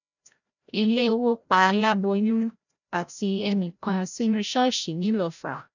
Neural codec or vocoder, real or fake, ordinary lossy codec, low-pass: codec, 16 kHz, 0.5 kbps, FreqCodec, larger model; fake; none; 7.2 kHz